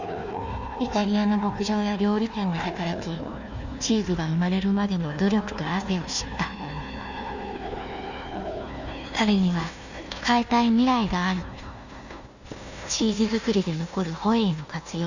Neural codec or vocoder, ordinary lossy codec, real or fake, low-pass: codec, 16 kHz, 1 kbps, FunCodec, trained on Chinese and English, 50 frames a second; none; fake; 7.2 kHz